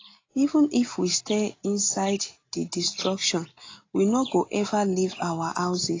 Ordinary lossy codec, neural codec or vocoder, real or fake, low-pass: AAC, 32 kbps; none; real; 7.2 kHz